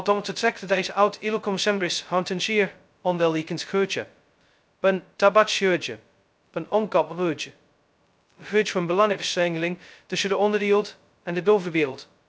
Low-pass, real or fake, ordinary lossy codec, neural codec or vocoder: none; fake; none; codec, 16 kHz, 0.2 kbps, FocalCodec